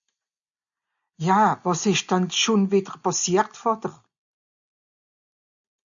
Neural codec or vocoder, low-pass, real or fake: none; 7.2 kHz; real